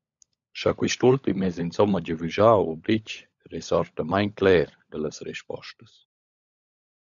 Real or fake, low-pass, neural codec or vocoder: fake; 7.2 kHz; codec, 16 kHz, 16 kbps, FunCodec, trained on LibriTTS, 50 frames a second